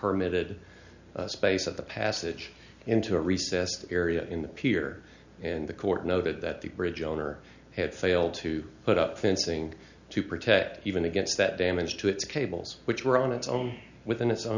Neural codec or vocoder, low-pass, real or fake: none; 7.2 kHz; real